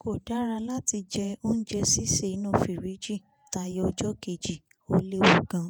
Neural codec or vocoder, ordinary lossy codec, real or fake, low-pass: vocoder, 48 kHz, 128 mel bands, Vocos; none; fake; none